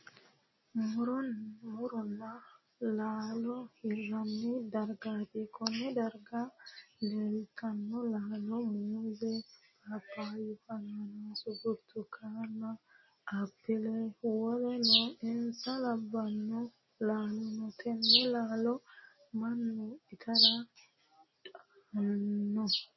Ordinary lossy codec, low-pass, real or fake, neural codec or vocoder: MP3, 24 kbps; 7.2 kHz; real; none